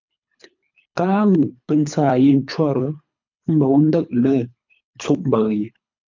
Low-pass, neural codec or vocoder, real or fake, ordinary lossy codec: 7.2 kHz; codec, 24 kHz, 3 kbps, HILCodec; fake; MP3, 64 kbps